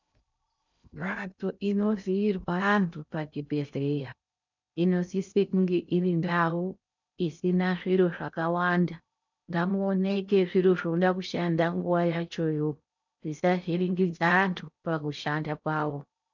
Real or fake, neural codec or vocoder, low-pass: fake; codec, 16 kHz in and 24 kHz out, 0.6 kbps, FocalCodec, streaming, 2048 codes; 7.2 kHz